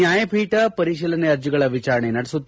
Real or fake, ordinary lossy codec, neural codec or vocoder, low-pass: real; none; none; none